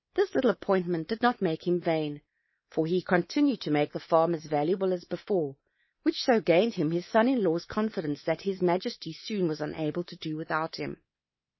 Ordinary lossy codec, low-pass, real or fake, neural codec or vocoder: MP3, 24 kbps; 7.2 kHz; fake; codec, 24 kHz, 3.1 kbps, DualCodec